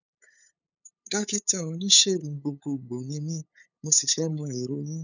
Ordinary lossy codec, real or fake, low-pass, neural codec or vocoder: none; fake; 7.2 kHz; codec, 16 kHz, 8 kbps, FunCodec, trained on LibriTTS, 25 frames a second